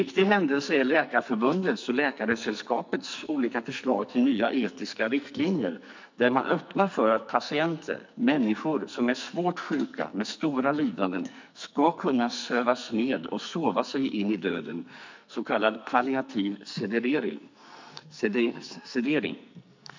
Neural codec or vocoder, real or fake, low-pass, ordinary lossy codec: codec, 44.1 kHz, 2.6 kbps, SNAC; fake; 7.2 kHz; MP3, 64 kbps